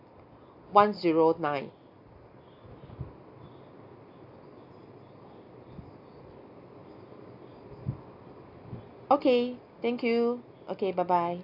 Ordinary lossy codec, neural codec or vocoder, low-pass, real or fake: AAC, 48 kbps; none; 5.4 kHz; real